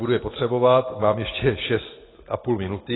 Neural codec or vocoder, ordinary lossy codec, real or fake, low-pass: none; AAC, 16 kbps; real; 7.2 kHz